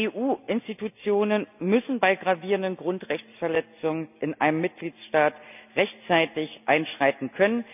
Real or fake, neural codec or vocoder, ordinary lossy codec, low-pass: real; none; none; 3.6 kHz